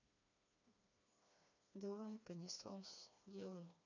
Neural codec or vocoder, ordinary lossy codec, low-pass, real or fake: codec, 16 kHz, 2 kbps, FreqCodec, smaller model; none; 7.2 kHz; fake